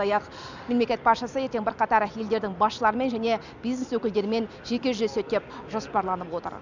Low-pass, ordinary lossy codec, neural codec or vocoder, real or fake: 7.2 kHz; none; none; real